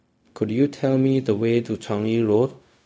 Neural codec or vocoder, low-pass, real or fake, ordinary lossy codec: codec, 16 kHz, 0.4 kbps, LongCat-Audio-Codec; none; fake; none